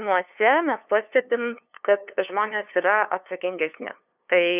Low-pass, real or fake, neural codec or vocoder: 3.6 kHz; fake; codec, 16 kHz, 2 kbps, FunCodec, trained on LibriTTS, 25 frames a second